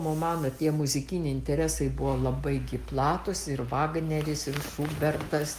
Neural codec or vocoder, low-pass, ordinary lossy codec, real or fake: none; 14.4 kHz; Opus, 32 kbps; real